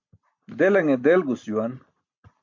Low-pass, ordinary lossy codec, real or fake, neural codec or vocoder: 7.2 kHz; MP3, 48 kbps; real; none